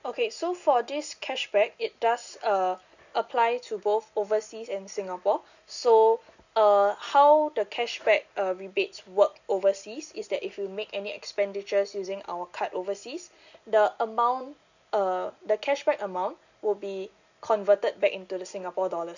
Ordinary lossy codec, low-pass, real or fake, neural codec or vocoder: none; 7.2 kHz; real; none